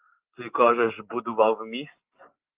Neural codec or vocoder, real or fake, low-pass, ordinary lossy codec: none; real; 3.6 kHz; Opus, 32 kbps